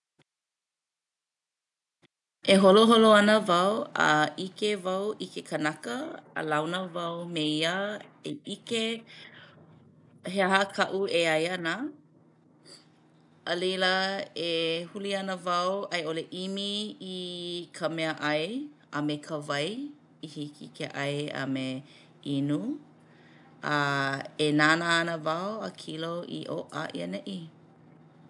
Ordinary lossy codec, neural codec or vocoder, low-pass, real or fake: none; none; 10.8 kHz; real